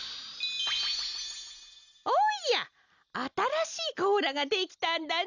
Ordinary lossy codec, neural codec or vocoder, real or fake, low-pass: none; none; real; 7.2 kHz